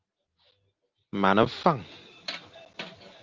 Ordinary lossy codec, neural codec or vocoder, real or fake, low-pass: Opus, 32 kbps; none; real; 7.2 kHz